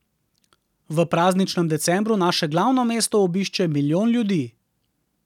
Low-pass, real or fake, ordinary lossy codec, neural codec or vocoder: 19.8 kHz; real; none; none